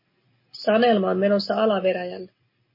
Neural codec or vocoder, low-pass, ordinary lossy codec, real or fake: none; 5.4 kHz; MP3, 24 kbps; real